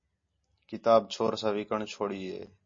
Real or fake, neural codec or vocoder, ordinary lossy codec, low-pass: real; none; MP3, 32 kbps; 7.2 kHz